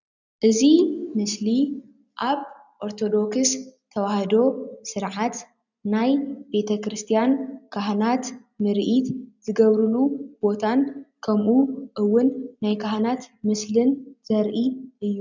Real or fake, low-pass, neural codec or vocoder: real; 7.2 kHz; none